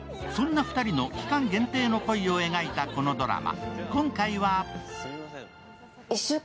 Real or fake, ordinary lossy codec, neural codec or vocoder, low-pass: real; none; none; none